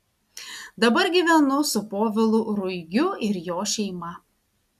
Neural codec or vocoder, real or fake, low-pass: none; real; 14.4 kHz